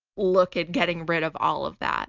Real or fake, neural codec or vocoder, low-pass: real; none; 7.2 kHz